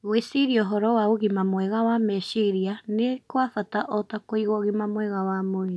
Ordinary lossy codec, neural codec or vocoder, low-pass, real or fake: none; none; none; real